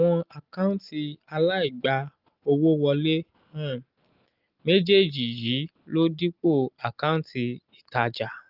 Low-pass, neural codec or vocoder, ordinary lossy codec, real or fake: 5.4 kHz; none; Opus, 32 kbps; real